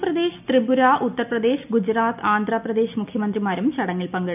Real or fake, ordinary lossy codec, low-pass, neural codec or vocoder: real; none; 3.6 kHz; none